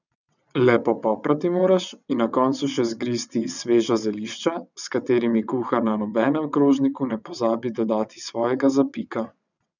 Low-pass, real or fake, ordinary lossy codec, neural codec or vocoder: 7.2 kHz; fake; none; vocoder, 22.05 kHz, 80 mel bands, WaveNeXt